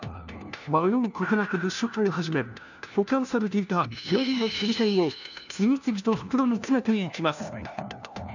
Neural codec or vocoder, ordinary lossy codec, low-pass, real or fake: codec, 16 kHz, 1 kbps, FunCodec, trained on LibriTTS, 50 frames a second; none; 7.2 kHz; fake